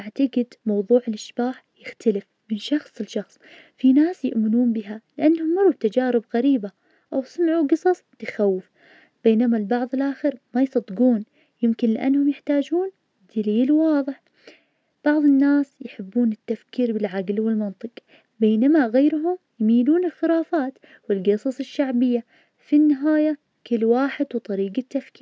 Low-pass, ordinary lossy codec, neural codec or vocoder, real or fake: none; none; none; real